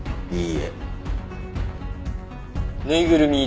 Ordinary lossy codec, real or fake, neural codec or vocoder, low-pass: none; real; none; none